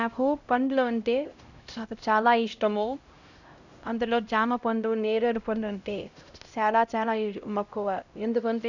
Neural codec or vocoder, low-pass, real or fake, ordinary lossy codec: codec, 16 kHz, 0.5 kbps, X-Codec, HuBERT features, trained on LibriSpeech; 7.2 kHz; fake; none